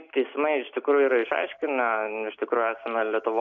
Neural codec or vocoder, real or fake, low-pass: none; real; 7.2 kHz